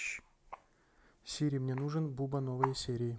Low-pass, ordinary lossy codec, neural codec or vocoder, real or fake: none; none; none; real